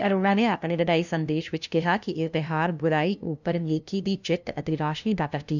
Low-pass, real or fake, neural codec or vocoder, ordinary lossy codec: 7.2 kHz; fake; codec, 16 kHz, 0.5 kbps, FunCodec, trained on LibriTTS, 25 frames a second; none